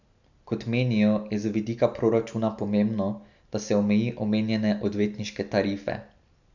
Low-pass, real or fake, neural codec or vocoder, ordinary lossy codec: 7.2 kHz; real; none; none